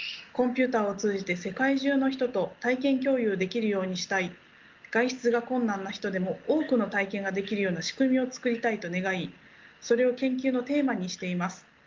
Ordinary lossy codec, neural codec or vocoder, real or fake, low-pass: Opus, 32 kbps; none; real; 7.2 kHz